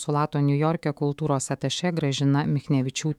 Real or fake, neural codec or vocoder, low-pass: fake; autoencoder, 48 kHz, 128 numbers a frame, DAC-VAE, trained on Japanese speech; 19.8 kHz